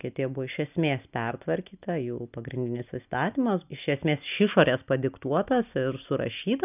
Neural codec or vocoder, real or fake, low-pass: none; real; 3.6 kHz